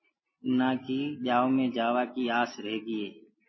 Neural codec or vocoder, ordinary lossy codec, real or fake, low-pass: none; MP3, 24 kbps; real; 7.2 kHz